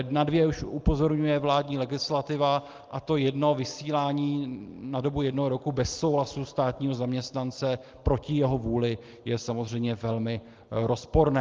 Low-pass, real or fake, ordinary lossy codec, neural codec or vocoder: 7.2 kHz; real; Opus, 32 kbps; none